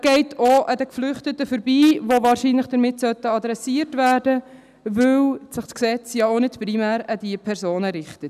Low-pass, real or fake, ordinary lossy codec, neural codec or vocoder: 14.4 kHz; real; none; none